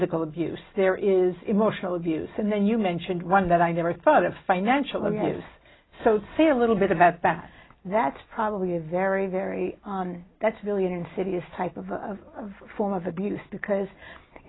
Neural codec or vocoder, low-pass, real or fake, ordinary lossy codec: none; 7.2 kHz; real; AAC, 16 kbps